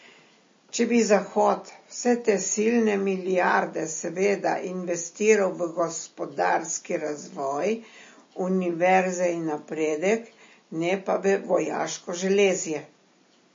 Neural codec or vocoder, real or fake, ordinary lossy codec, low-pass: none; real; MP3, 32 kbps; 7.2 kHz